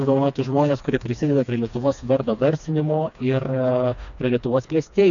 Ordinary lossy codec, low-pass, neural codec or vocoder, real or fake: MP3, 96 kbps; 7.2 kHz; codec, 16 kHz, 2 kbps, FreqCodec, smaller model; fake